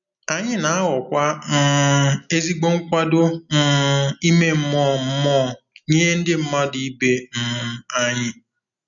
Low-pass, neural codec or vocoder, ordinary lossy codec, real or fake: 7.2 kHz; none; none; real